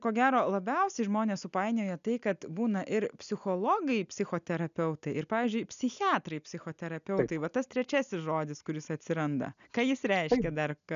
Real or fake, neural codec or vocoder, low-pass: real; none; 7.2 kHz